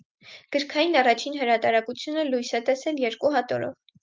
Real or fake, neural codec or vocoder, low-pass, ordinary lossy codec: real; none; 7.2 kHz; Opus, 32 kbps